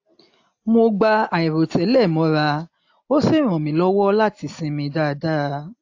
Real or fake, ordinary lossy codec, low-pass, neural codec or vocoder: real; AAC, 48 kbps; 7.2 kHz; none